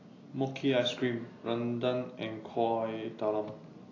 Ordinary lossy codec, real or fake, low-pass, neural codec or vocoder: AAC, 32 kbps; real; 7.2 kHz; none